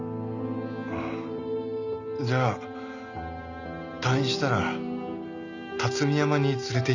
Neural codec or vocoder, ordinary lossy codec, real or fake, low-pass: none; none; real; 7.2 kHz